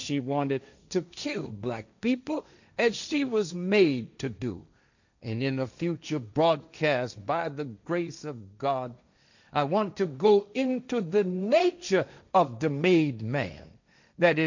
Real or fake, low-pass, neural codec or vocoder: fake; 7.2 kHz; codec, 16 kHz, 1.1 kbps, Voila-Tokenizer